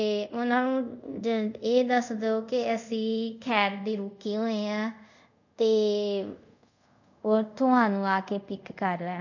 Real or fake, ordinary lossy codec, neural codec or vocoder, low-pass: fake; none; codec, 24 kHz, 0.5 kbps, DualCodec; 7.2 kHz